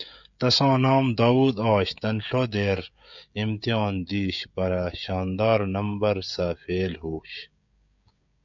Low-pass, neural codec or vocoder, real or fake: 7.2 kHz; codec, 16 kHz, 16 kbps, FreqCodec, smaller model; fake